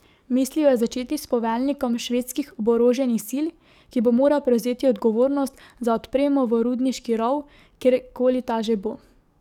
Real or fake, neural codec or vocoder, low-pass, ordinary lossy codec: fake; codec, 44.1 kHz, 7.8 kbps, DAC; 19.8 kHz; none